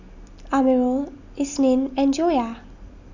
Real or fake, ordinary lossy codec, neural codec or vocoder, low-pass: real; none; none; 7.2 kHz